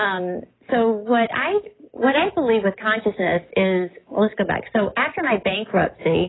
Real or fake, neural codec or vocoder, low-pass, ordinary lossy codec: real; none; 7.2 kHz; AAC, 16 kbps